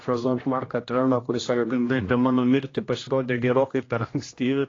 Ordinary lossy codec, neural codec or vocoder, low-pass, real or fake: AAC, 32 kbps; codec, 16 kHz, 1 kbps, X-Codec, HuBERT features, trained on general audio; 7.2 kHz; fake